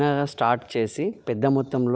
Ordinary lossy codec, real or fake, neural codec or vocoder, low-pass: none; real; none; none